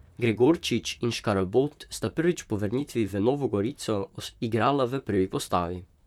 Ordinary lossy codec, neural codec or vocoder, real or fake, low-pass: none; vocoder, 44.1 kHz, 128 mel bands, Pupu-Vocoder; fake; 19.8 kHz